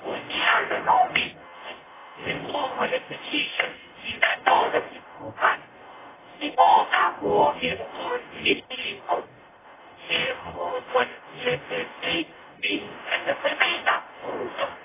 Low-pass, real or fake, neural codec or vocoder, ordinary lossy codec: 3.6 kHz; fake; codec, 44.1 kHz, 0.9 kbps, DAC; AAC, 16 kbps